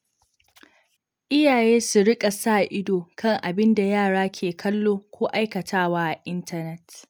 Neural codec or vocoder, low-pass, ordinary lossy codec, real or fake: none; none; none; real